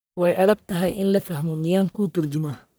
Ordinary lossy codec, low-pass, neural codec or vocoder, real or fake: none; none; codec, 44.1 kHz, 1.7 kbps, Pupu-Codec; fake